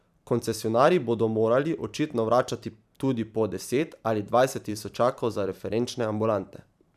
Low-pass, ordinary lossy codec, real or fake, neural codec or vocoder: 14.4 kHz; none; fake; vocoder, 44.1 kHz, 128 mel bands every 512 samples, BigVGAN v2